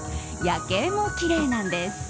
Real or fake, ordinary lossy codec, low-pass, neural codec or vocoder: real; none; none; none